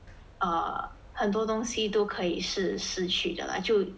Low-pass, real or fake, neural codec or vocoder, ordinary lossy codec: none; real; none; none